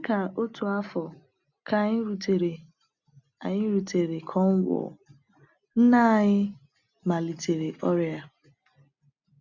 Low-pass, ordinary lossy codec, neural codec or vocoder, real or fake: 7.2 kHz; none; none; real